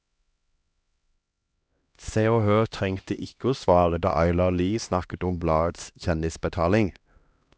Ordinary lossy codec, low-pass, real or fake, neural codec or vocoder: none; none; fake; codec, 16 kHz, 1 kbps, X-Codec, HuBERT features, trained on LibriSpeech